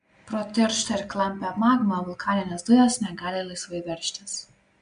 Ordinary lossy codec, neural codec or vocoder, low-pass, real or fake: MP3, 64 kbps; none; 9.9 kHz; real